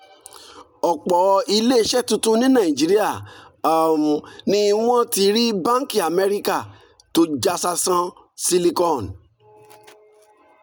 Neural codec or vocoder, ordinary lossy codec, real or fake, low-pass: none; none; real; none